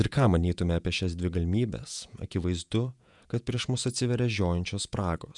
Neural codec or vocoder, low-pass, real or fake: vocoder, 48 kHz, 128 mel bands, Vocos; 10.8 kHz; fake